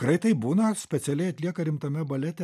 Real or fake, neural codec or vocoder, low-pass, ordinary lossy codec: real; none; 14.4 kHz; MP3, 96 kbps